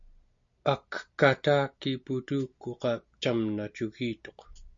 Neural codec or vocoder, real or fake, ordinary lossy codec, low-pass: none; real; MP3, 48 kbps; 7.2 kHz